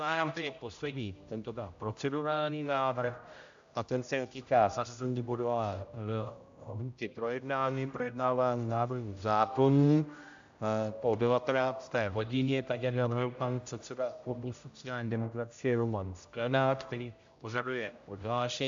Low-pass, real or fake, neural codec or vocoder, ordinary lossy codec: 7.2 kHz; fake; codec, 16 kHz, 0.5 kbps, X-Codec, HuBERT features, trained on general audio; AAC, 64 kbps